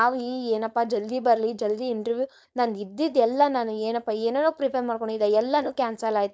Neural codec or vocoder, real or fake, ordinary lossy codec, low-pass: codec, 16 kHz, 4.8 kbps, FACodec; fake; none; none